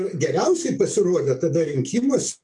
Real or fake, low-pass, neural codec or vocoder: fake; 10.8 kHz; codec, 44.1 kHz, 7.8 kbps, DAC